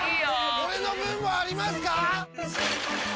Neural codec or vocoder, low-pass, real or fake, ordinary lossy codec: none; none; real; none